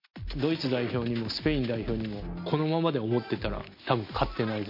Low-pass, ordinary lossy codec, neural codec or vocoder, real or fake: 5.4 kHz; MP3, 32 kbps; none; real